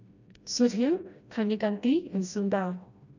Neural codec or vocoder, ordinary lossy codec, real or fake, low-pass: codec, 16 kHz, 1 kbps, FreqCodec, smaller model; none; fake; 7.2 kHz